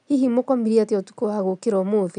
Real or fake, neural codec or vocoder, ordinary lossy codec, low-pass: real; none; none; 9.9 kHz